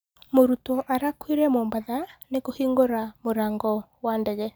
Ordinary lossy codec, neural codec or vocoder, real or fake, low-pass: none; none; real; none